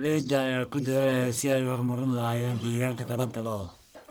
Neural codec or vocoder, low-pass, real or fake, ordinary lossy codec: codec, 44.1 kHz, 1.7 kbps, Pupu-Codec; none; fake; none